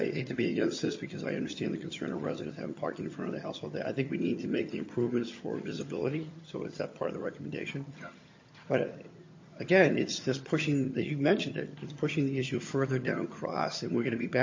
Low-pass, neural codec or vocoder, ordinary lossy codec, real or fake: 7.2 kHz; vocoder, 22.05 kHz, 80 mel bands, HiFi-GAN; MP3, 32 kbps; fake